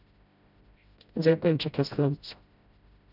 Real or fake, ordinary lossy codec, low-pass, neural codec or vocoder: fake; none; 5.4 kHz; codec, 16 kHz, 0.5 kbps, FreqCodec, smaller model